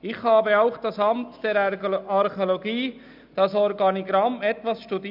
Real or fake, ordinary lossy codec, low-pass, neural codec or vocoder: real; none; 5.4 kHz; none